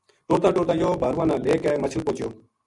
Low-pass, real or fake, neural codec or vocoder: 10.8 kHz; real; none